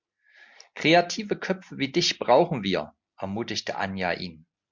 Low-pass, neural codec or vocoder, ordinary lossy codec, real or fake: 7.2 kHz; none; MP3, 64 kbps; real